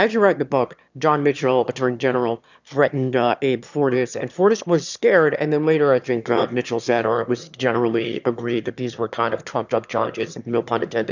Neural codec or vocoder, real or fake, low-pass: autoencoder, 22.05 kHz, a latent of 192 numbers a frame, VITS, trained on one speaker; fake; 7.2 kHz